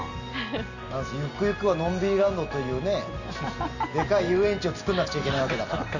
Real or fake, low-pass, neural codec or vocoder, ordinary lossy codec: real; 7.2 kHz; none; none